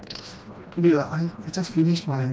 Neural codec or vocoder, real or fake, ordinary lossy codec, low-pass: codec, 16 kHz, 1 kbps, FreqCodec, smaller model; fake; none; none